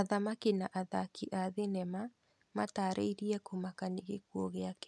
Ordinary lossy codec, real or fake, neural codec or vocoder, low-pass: none; real; none; none